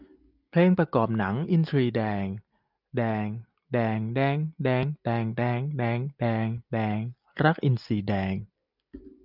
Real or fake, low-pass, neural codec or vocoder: real; 5.4 kHz; none